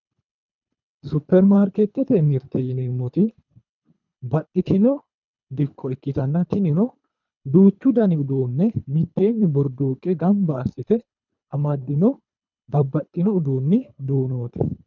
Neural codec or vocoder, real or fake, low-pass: codec, 24 kHz, 3 kbps, HILCodec; fake; 7.2 kHz